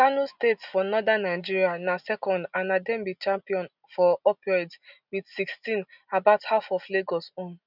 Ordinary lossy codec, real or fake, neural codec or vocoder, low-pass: none; real; none; 5.4 kHz